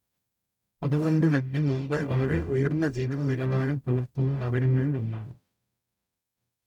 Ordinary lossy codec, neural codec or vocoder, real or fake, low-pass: none; codec, 44.1 kHz, 0.9 kbps, DAC; fake; 19.8 kHz